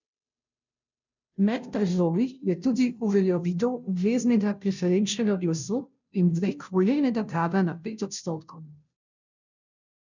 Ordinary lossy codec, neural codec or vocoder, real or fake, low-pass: none; codec, 16 kHz, 0.5 kbps, FunCodec, trained on Chinese and English, 25 frames a second; fake; 7.2 kHz